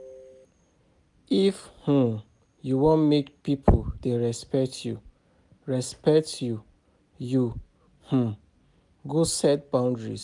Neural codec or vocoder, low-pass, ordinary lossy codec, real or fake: none; 10.8 kHz; none; real